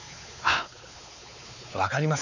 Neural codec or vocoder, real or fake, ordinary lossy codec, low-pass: codec, 16 kHz, 4 kbps, X-Codec, WavLM features, trained on Multilingual LibriSpeech; fake; none; 7.2 kHz